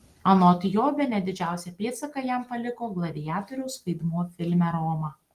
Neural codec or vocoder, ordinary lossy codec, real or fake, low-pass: none; Opus, 24 kbps; real; 14.4 kHz